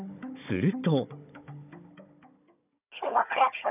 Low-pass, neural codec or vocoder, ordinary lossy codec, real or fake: 3.6 kHz; codec, 16 kHz, 16 kbps, FunCodec, trained on Chinese and English, 50 frames a second; none; fake